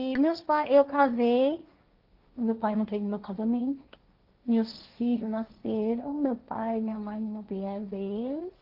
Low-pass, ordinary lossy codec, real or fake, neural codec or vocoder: 5.4 kHz; Opus, 24 kbps; fake; codec, 16 kHz, 1.1 kbps, Voila-Tokenizer